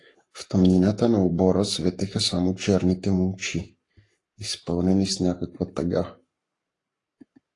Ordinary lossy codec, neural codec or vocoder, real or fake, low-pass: AAC, 48 kbps; codec, 44.1 kHz, 7.8 kbps, Pupu-Codec; fake; 10.8 kHz